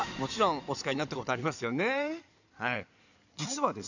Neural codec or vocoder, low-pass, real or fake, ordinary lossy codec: codec, 16 kHz in and 24 kHz out, 2.2 kbps, FireRedTTS-2 codec; 7.2 kHz; fake; none